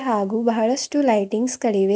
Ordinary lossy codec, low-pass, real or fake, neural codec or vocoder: none; none; real; none